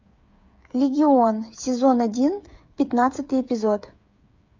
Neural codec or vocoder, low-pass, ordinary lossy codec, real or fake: codec, 16 kHz, 8 kbps, FreqCodec, smaller model; 7.2 kHz; MP3, 64 kbps; fake